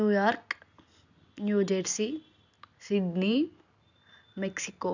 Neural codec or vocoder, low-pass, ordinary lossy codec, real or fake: none; 7.2 kHz; none; real